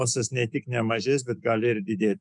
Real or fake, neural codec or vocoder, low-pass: fake; autoencoder, 48 kHz, 128 numbers a frame, DAC-VAE, trained on Japanese speech; 10.8 kHz